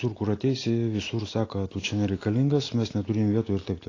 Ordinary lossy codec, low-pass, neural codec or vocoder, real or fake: AAC, 32 kbps; 7.2 kHz; none; real